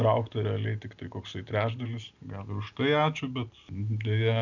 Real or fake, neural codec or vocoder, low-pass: real; none; 7.2 kHz